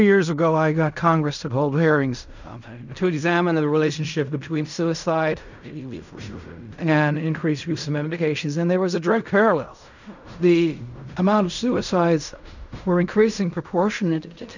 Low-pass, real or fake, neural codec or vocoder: 7.2 kHz; fake; codec, 16 kHz in and 24 kHz out, 0.4 kbps, LongCat-Audio-Codec, fine tuned four codebook decoder